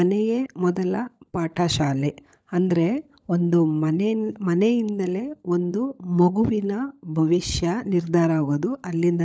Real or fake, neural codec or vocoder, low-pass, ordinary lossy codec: fake; codec, 16 kHz, 16 kbps, FunCodec, trained on LibriTTS, 50 frames a second; none; none